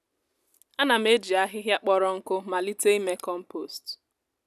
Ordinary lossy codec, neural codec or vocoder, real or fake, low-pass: none; none; real; 14.4 kHz